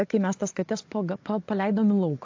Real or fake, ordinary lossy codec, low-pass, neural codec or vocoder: fake; AAC, 48 kbps; 7.2 kHz; vocoder, 24 kHz, 100 mel bands, Vocos